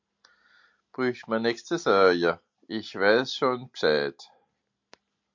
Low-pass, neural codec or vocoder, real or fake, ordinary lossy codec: 7.2 kHz; none; real; MP3, 48 kbps